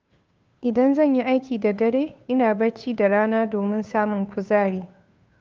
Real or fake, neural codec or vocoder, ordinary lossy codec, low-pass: fake; codec, 16 kHz, 2 kbps, FunCodec, trained on Chinese and English, 25 frames a second; Opus, 24 kbps; 7.2 kHz